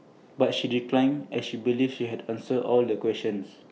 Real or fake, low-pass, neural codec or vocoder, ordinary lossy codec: real; none; none; none